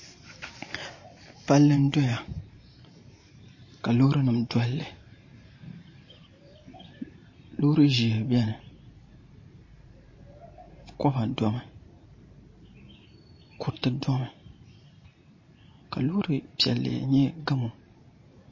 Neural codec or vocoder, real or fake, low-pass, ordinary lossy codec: vocoder, 44.1 kHz, 128 mel bands every 512 samples, BigVGAN v2; fake; 7.2 kHz; MP3, 32 kbps